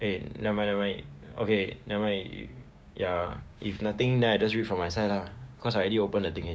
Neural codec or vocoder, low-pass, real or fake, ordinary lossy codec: codec, 16 kHz, 6 kbps, DAC; none; fake; none